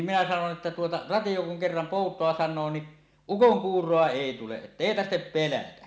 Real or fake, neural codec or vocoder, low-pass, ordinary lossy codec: real; none; none; none